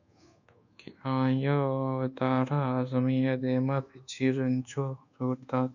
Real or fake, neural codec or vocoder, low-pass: fake; codec, 24 kHz, 1.2 kbps, DualCodec; 7.2 kHz